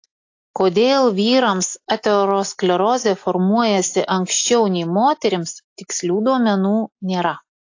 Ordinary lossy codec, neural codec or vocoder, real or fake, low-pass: AAC, 48 kbps; none; real; 7.2 kHz